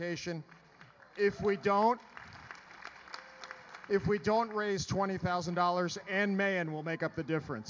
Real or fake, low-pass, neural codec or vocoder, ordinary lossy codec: real; 7.2 kHz; none; MP3, 64 kbps